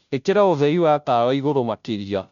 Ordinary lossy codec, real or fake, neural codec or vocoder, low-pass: none; fake; codec, 16 kHz, 0.5 kbps, FunCodec, trained on Chinese and English, 25 frames a second; 7.2 kHz